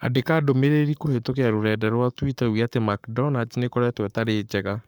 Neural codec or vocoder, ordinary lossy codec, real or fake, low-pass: codec, 44.1 kHz, 7.8 kbps, Pupu-Codec; none; fake; 19.8 kHz